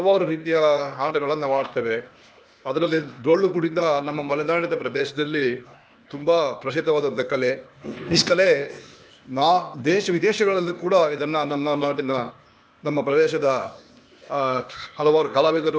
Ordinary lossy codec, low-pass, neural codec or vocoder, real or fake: none; none; codec, 16 kHz, 0.8 kbps, ZipCodec; fake